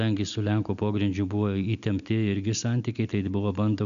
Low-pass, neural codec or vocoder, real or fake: 7.2 kHz; none; real